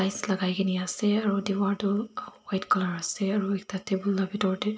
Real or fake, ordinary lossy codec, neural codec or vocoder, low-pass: real; none; none; none